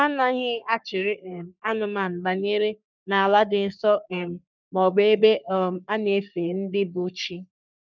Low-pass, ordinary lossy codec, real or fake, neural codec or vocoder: 7.2 kHz; none; fake; codec, 44.1 kHz, 3.4 kbps, Pupu-Codec